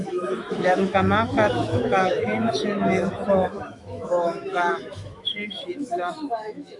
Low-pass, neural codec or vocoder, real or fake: 10.8 kHz; autoencoder, 48 kHz, 128 numbers a frame, DAC-VAE, trained on Japanese speech; fake